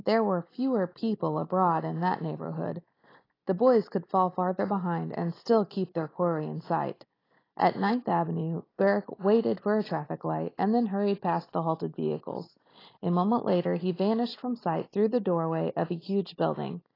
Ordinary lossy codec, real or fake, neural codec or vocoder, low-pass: AAC, 24 kbps; real; none; 5.4 kHz